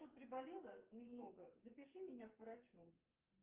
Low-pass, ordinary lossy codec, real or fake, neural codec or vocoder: 3.6 kHz; Opus, 32 kbps; fake; codec, 44.1 kHz, 2.6 kbps, SNAC